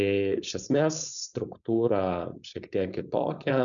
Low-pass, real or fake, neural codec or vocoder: 7.2 kHz; fake; codec, 16 kHz, 4.8 kbps, FACodec